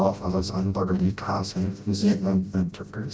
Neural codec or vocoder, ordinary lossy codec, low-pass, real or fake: codec, 16 kHz, 0.5 kbps, FreqCodec, smaller model; none; none; fake